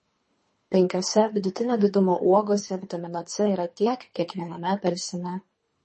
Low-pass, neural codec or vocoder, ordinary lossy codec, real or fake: 10.8 kHz; codec, 24 kHz, 3 kbps, HILCodec; MP3, 32 kbps; fake